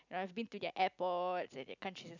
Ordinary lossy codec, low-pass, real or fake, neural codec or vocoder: none; 7.2 kHz; real; none